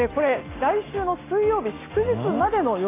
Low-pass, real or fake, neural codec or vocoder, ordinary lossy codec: 3.6 kHz; real; none; AAC, 16 kbps